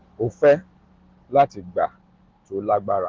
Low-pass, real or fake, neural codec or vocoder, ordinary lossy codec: 7.2 kHz; real; none; Opus, 16 kbps